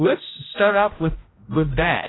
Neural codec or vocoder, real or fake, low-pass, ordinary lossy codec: codec, 16 kHz, 0.5 kbps, X-Codec, HuBERT features, trained on general audio; fake; 7.2 kHz; AAC, 16 kbps